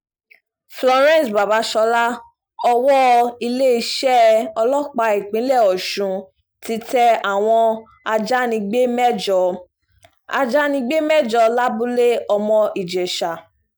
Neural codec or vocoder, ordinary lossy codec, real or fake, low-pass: none; none; real; none